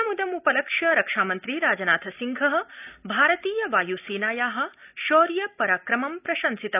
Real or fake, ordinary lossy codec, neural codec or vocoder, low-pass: real; none; none; 3.6 kHz